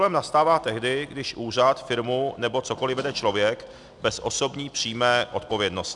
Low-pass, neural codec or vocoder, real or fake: 10.8 kHz; none; real